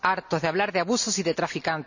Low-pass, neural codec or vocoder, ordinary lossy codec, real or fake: 7.2 kHz; none; none; real